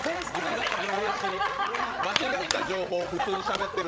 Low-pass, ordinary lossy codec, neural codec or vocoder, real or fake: none; none; codec, 16 kHz, 16 kbps, FreqCodec, larger model; fake